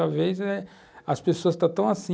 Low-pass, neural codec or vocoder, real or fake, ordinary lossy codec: none; none; real; none